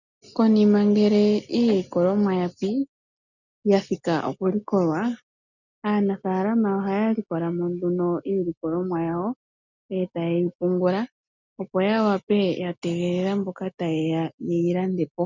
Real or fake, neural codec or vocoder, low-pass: real; none; 7.2 kHz